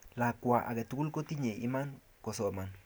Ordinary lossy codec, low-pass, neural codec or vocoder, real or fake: none; none; none; real